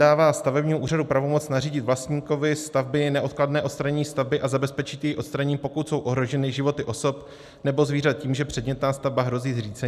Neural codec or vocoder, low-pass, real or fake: none; 14.4 kHz; real